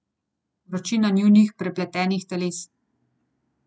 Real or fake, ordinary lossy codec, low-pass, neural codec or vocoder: real; none; none; none